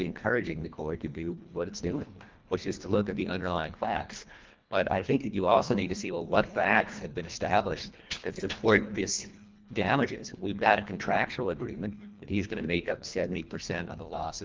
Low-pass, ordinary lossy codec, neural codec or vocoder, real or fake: 7.2 kHz; Opus, 24 kbps; codec, 24 kHz, 1.5 kbps, HILCodec; fake